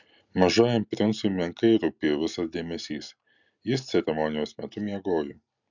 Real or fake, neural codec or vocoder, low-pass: real; none; 7.2 kHz